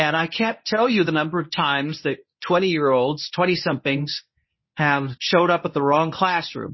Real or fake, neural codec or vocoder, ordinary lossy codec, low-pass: fake; codec, 24 kHz, 0.9 kbps, WavTokenizer, medium speech release version 1; MP3, 24 kbps; 7.2 kHz